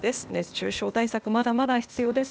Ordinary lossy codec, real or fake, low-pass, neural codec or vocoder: none; fake; none; codec, 16 kHz, 0.8 kbps, ZipCodec